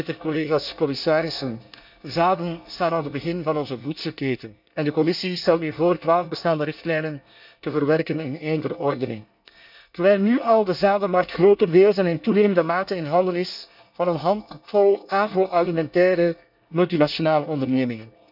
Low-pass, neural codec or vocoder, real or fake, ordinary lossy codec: 5.4 kHz; codec, 24 kHz, 1 kbps, SNAC; fake; none